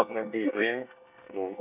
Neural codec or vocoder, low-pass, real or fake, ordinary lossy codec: codec, 24 kHz, 1 kbps, SNAC; 3.6 kHz; fake; none